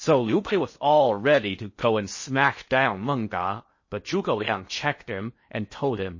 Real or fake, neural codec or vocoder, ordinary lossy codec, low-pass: fake; codec, 16 kHz in and 24 kHz out, 0.6 kbps, FocalCodec, streaming, 4096 codes; MP3, 32 kbps; 7.2 kHz